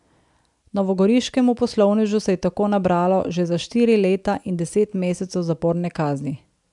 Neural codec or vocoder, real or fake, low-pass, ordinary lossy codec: none; real; 10.8 kHz; MP3, 96 kbps